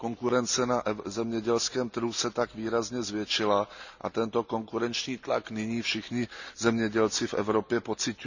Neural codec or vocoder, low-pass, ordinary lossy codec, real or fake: none; 7.2 kHz; none; real